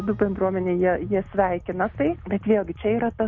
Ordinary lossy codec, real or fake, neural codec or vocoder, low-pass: MP3, 48 kbps; real; none; 7.2 kHz